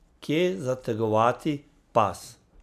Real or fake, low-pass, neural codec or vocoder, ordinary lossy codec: real; 14.4 kHz; none; none